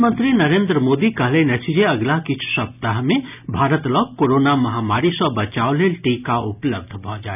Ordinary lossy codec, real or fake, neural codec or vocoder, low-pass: none; real; none; 3.6 kHz